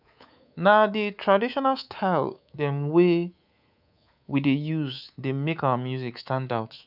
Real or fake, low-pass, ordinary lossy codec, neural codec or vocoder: fake; 5.4 kHz; none; codec, 24 kHz, 3.1 kbps, DualCodec